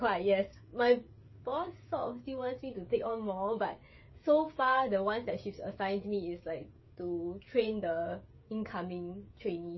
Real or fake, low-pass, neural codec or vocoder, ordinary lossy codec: fake; 7.2 kHz; codec, 16 kHz, 16 kbps, FreqCodec, smaller model; MP3, 24 kbps